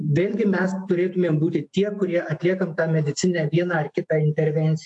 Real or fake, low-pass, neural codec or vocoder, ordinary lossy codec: fake; 10.8 kHz; autoencoder, 48 kHz, 128 numbers a frame, DAC-VAE, trained on Japanese speech; AAC, 64 kbps